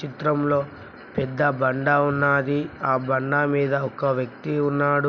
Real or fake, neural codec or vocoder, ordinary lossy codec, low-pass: real; none; none; 7.2 kHz